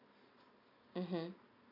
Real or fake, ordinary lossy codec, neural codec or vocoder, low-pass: real; none; none; 5.4 kHz